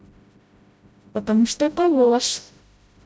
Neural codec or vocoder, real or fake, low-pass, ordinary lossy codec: codec, 16 kHz, 0.5 kbps, FreqCodec, smaller model; fake; none; none